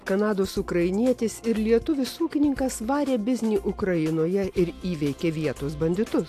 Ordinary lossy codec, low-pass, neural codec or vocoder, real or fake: AAC, 64 kbps; 14.4 kHz; none; real